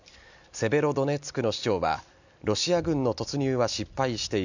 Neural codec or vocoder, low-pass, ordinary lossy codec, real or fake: none; 7.2 kHz; none; real